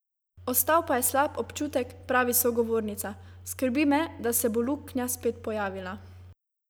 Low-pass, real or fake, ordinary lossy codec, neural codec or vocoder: none; real; none; none